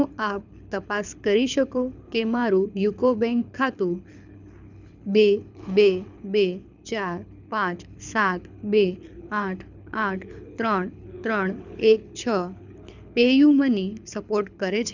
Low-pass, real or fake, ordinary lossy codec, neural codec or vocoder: 7.2 kHz; fake; none; codec, 24 kHz, 6 kbps, HILCodec